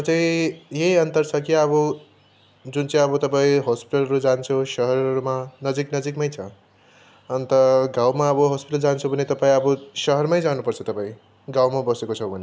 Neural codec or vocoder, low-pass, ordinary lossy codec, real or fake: none; none; none; real